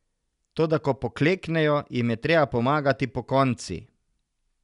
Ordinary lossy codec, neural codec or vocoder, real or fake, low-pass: none; none; real; 10.8 kHz